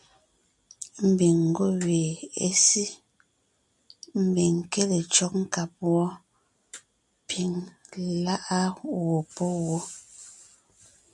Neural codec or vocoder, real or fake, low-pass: none; real; 10.8 kHz